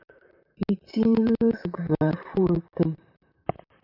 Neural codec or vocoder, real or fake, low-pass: vocoder, 44.1 kHz, 128 mel bands, Pupu-Vocoder; fake; 5.4 kHz